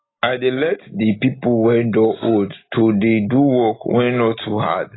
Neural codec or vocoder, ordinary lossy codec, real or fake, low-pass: none; AAC, 16 kbps; real; 7.2 kHz